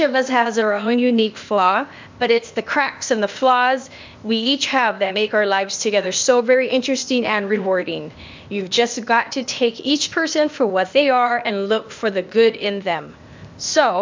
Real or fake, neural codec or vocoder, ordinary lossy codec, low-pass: fake; codec, 16 kHz, 0.8 kbps, ZipCodec; MP3, 64 kbps; 7.2 kHz